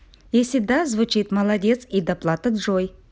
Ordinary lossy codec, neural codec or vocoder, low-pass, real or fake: none; none; none; real